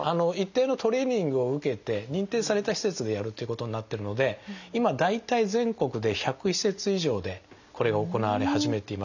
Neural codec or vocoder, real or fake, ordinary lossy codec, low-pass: none; real; none; 7.2 kHz